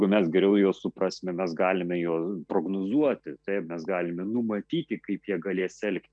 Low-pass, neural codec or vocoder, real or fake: 9.9 kHz; none; real